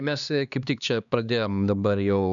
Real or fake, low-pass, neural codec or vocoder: fake; 7.2 kHz; codec, 16 kHz, 2 kbps, X-Codec, HuBERT features, trained on LibriSpeech